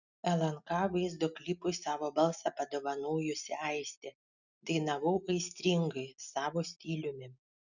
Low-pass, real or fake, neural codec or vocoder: 7.2 kHz; real; none